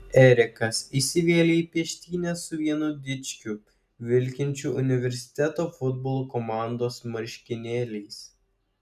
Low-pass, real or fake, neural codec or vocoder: 14.4 kHz; real; none